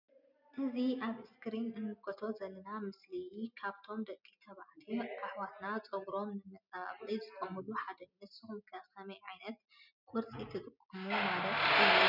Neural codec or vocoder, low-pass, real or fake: none; 5.4 kHz; real